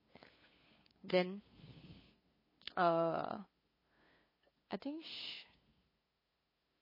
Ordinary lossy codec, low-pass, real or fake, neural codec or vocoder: MP3, 24 kbps; 5.4 kHz; fake; codec, 16 kHz, 2 kbps, FunCodec, trained on LibriTTS, 25 frames a second